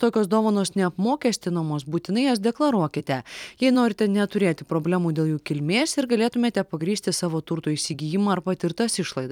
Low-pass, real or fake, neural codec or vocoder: 19.8 kHz; fake; vocoder, 44.1 kHz, 128 mel bands every 256 samples, BigVGAN v2